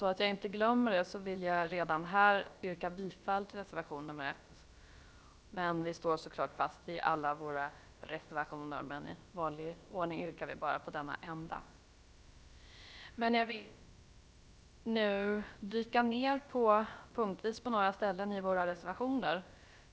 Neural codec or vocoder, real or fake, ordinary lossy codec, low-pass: codec, 16 kHz, about 1 kbps, DyCAST, with the encoder's durations; fake; none; none